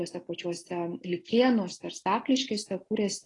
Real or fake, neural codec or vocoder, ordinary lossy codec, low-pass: real; none; AAC, 32 kbps; 10.8 kHz